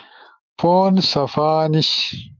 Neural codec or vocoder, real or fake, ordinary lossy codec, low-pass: autoencoder, 48 kHz, 128 numbers a frame, DAC-VAE, trained on Japanese speech; fake; Opus, 24 kbps; 7.2 kHz